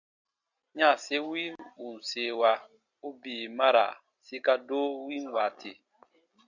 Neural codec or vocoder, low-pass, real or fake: none; 7.2 kHz; real